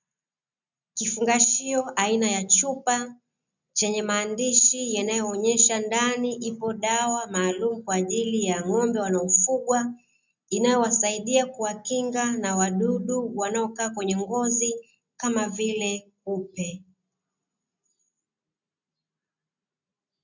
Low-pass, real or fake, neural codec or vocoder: 7.2 kHz; real; none